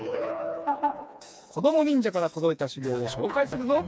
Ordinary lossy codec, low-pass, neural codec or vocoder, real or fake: none; none; codec, 16 kHz, 2 kbps, FreqCodec, smaller model; fake